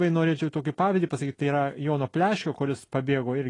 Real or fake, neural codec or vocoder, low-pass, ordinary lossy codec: real; none; 10.8 kHz; AAC, 32 kbps